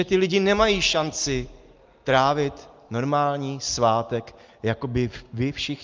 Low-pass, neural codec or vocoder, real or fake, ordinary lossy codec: 7.2 kHz; none; real; Opus, 24 kbps